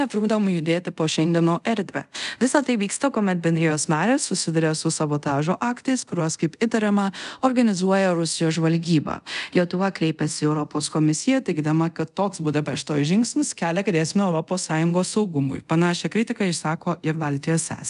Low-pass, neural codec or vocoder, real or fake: 10.8 kHz; codec, 24 kHz, 0.5 kbps, DualCodec; fake